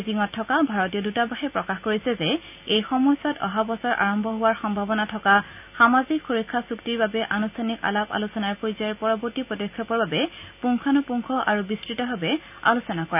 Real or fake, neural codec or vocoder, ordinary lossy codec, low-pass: real; none; none; 3.6 kHz